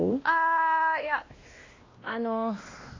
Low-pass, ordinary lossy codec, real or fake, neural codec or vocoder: 7.2 kHz; AAC, 32 kbps; fake; codec, 16 kHz, 1 kbps, X-Codec, WavLM features, trained on Multilingual LibriSpeech